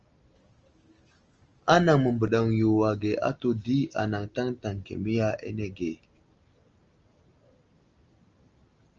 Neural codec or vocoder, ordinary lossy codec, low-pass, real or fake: none; Opus, 24 kbps; 7.2 kHz; real